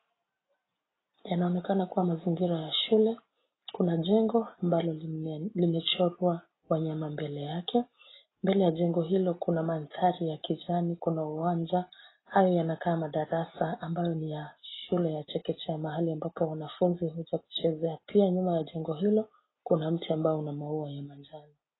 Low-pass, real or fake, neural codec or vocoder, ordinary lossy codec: 7.2 kHz; real; none; AAC, 16 kbps